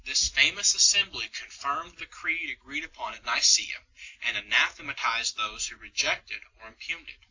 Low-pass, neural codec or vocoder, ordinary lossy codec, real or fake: 7.2 kHz; none; AAC, 48 kbps; real